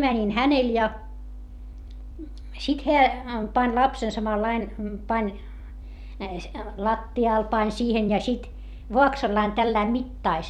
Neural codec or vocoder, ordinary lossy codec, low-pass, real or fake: vocoder, 44.1 kHz, 128 mel bands every 256 samples, BigVGAN v2; none; 19.8 kHz; fake